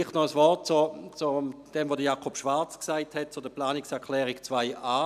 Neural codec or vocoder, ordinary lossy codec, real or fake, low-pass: none; none; real; 14.4 kHz